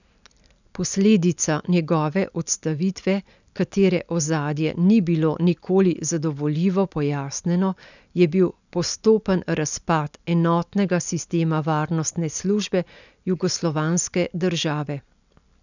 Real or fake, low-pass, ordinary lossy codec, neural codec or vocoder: real; 7.2 kHz; none; none